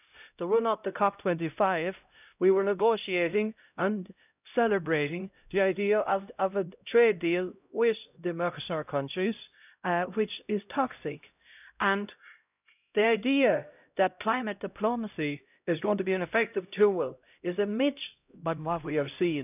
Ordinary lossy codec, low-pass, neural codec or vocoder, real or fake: none; 3.6 kHz; codec, 16 kHz, 0.5 kbps, X-Codec, HuBERT features, trained on LibriSpeech; fake